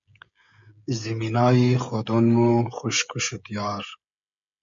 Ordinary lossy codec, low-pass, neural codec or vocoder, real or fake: MP3, 64 kbps; 7.2 kHz; codec, 16 kHz, 16 kbps, FreqCodec, smaller model; fake